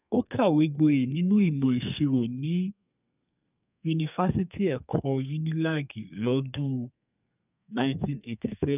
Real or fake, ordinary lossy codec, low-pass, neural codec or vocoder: fake; none; 3.6 kHz; codec, 32 kHz, 1.9 kbps, SNAC